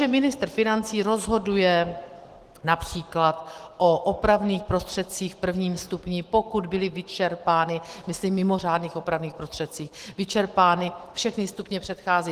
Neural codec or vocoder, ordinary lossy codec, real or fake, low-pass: autoencoder, 48 kHz, 128 numbers a frame, DAC-VAE, trained on Japanese speech; Opus, 16 kbps; fake; 14.4 kHz